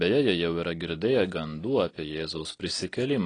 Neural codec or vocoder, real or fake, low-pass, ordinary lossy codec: none; real; 9.9 kHz; AAC, 32 kbps